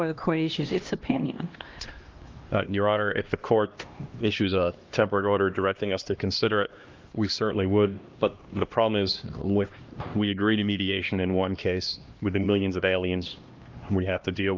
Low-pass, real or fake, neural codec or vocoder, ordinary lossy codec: 7.2 kHz; fake; codec, 16 kHz, 1 kbps, X-Codec, HuBERT features, trained on LibriSpeech; Opus, 24 kbps